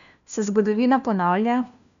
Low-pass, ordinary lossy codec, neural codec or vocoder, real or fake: 7.2 kHz; none; codec, 16 kHz, 1 kbps, FunCodec, trained on LibriTTS, 50 frames a second; fake